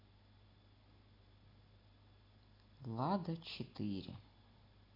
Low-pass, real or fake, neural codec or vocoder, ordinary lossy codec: 5.4 kHz; real; none; AAC, 24 kbps